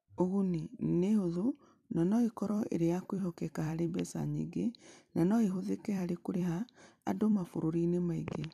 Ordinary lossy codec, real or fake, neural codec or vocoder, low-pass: none; fake; vocoder, 44.1 kHz, 128 mel bands every 256 samples, BigVGAN v2; 14.4 kHz